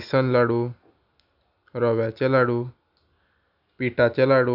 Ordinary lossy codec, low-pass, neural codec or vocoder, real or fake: none; 5.4 kHz; none; real